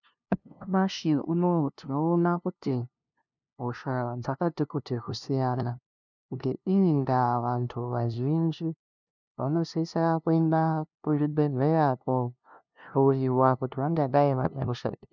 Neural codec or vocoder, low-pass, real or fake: codec, 16 kHz, 0.5 kbps, FunCodec, trained on LibriTTS, 25 frames a second; 7.2 kHz; fake